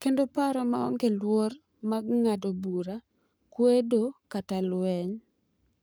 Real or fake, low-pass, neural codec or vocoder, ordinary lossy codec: fake; none; codec, 44.1 kHz, 7.8 kbps, Pupu-Codec; none